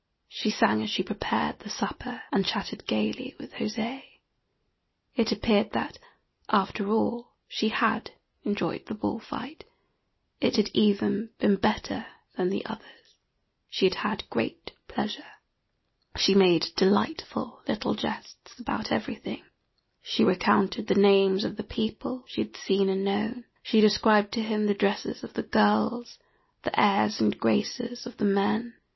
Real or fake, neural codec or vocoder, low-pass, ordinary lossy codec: real; none; 7.2 kHz; MP3, 24 kbps